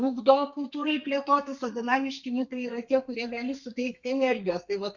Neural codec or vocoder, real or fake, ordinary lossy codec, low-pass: codec, 32 kHz, 1.9 kbps, SNAC; fake; Opus, 64 kbps; 7.2 kHz